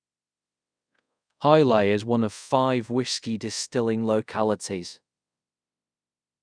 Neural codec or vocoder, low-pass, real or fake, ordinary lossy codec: codec, 24 kHz, 0.5 kbps, DualCodec; 9.9 kHz; fake; none